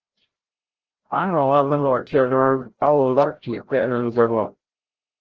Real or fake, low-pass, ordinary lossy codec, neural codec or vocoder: fake; 7.2 kHz; Opus, 16 kbps; codec, 16 kHz, 0.5 kbps, FreqCodec, larger model